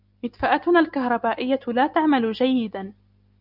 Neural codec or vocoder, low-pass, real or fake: none; 5.4 kHz; real